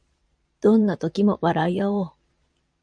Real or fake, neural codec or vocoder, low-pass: fake; vocoder, 22.05 kHz, 80 mel bands, Vocos; 9.9 kHz